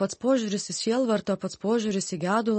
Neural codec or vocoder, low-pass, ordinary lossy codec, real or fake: none; 10.8 kHz; MP3, 32 kbps; real